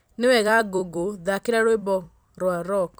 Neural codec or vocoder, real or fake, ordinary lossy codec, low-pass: vocoder, 44.1 kHz, 128 mel bands every 256 samples, BigVGAN v2; fake; none; none